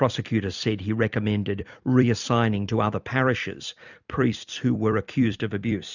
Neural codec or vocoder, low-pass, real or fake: vocoder, 44.1 kHz, 128 mel bands every 256 samples, BigVGAN v2; 7.2 kHz; fake